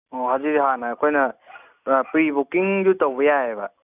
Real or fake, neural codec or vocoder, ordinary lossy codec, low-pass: real; none; none; 3.6 kHz